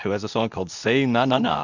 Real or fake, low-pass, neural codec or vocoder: fake; 7.2 kHz; codec, 24 kHz, 0.9 kbps, WavTokenizer, medium speech release version 2